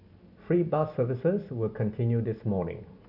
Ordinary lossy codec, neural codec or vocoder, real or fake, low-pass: none; none; real; 5.4 kHz